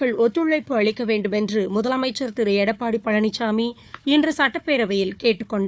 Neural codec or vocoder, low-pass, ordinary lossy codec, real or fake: codec, 16 kHz, 4 kbps, FunCodec, trained on Chinese and English, 50 frames a second; none; none; fake